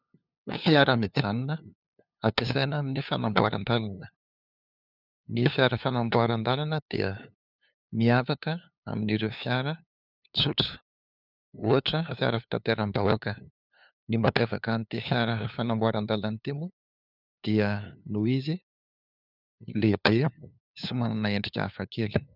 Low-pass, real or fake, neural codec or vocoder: 5.4 kHz; fake; codec, 16 kHz, 2 kbps, FunCodec, trained on LibriTTS, 25 frames a second